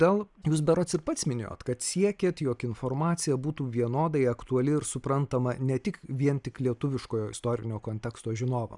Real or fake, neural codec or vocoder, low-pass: real; none; 10.8 kHz